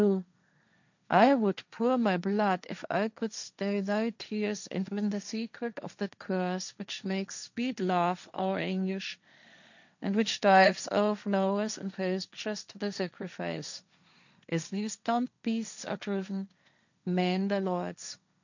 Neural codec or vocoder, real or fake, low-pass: codec, 16 kHz, 1.1 kbps, Voila-Tokenizer; fake; 7.2 kHz